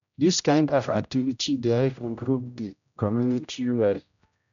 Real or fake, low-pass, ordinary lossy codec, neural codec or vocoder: fake; 7.2 kHz; none; codec, 16 kHz, 0.5 kbps, X-Codec, HuBERT features, trained on general audio